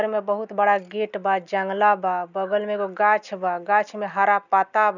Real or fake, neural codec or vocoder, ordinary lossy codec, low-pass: real; none; none; 7.2 kHz